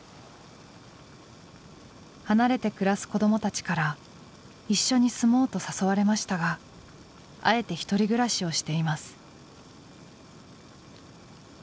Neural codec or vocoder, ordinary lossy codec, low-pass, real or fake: none; none; none; real